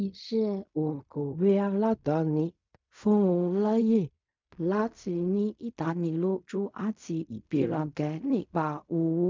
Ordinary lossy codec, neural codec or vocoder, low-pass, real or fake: MP3, 64 kbps; codec, 16 kHz in and 24 kHz out, 0.4 kbps, LongCat-Audio-Codec, fine tuned four codebook decoder; 7.2 kHz; fake